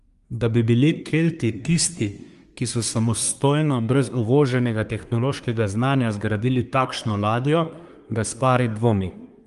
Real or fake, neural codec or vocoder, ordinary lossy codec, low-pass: fake; codec, 24 kHz, 1 kbps, SNAC; Opus, 32 kbps; 10.8 kHz